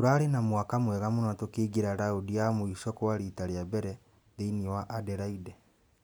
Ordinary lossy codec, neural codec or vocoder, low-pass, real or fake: none; none; none; real